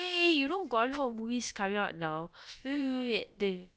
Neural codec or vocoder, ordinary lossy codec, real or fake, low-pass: codec, 16 kHz, about 1 kbps, DyCAST, with the encoder's durations; none; fake; none